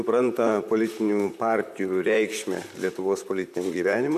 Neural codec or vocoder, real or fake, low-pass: vocoder, 44.1 kHz, 128 mel bands, Pupu-Vocoder; fake; 14.4 kHz